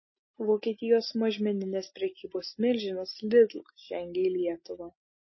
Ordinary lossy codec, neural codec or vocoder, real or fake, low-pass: MP3, 24 kbps; none; real; 7.2 kHz